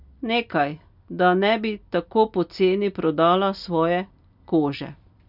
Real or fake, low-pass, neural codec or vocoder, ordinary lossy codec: real; 5.4 kHz; none; none